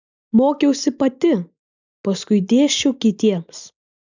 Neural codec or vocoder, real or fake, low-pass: none; real; 7.2 kHz